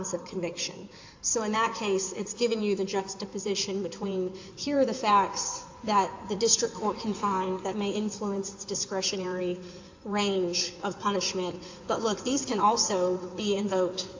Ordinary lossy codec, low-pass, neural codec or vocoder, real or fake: AAC, 48 kbps; 7.2 kHz; codec, 16 kHz in and 24 kHz out, 2.2 kbps, FireRedTTS-2 codec; fake